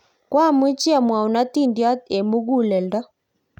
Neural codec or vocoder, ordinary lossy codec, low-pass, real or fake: none; none; 19.8 kHz; real